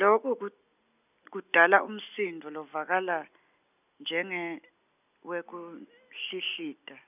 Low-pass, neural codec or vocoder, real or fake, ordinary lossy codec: 3.6 kHz; none; real; none